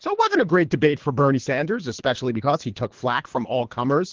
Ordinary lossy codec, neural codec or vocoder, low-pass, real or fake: Opus, 16 kbps; codec, 24 kHz, 3 kbps, HILCodec; 7.2 kHz; fake